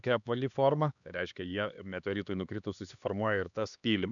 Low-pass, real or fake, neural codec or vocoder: 7.2 kHz; fake; codec, 16 kHz, 2 kbps, X-Codec, HuBERT features, trained on LibriSpeech